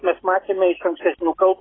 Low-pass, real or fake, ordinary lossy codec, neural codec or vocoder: 7.2 kHz; real; AAC, 16 kbps; none